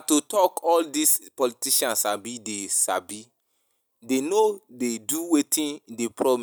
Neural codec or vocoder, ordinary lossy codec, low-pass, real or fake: none; none; none; real